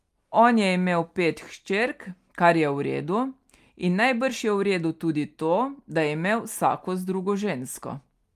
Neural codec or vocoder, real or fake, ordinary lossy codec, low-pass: none; real; Opus, 32 kbps; 14.4 kHz